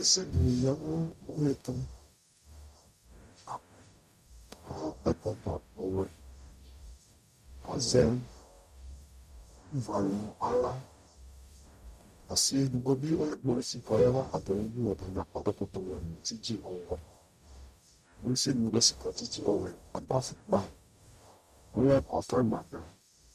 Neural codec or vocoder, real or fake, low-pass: codec, 44.1 kHz, 0.9 kbps, DAC; fake; 14.4 kHz